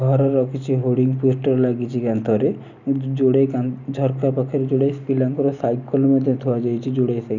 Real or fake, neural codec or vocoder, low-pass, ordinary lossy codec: real; none; 7.2 kHz; none